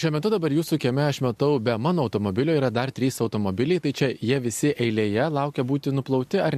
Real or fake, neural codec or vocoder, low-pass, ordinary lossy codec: real; none; 14.4 kHz; MP3, 64 kbps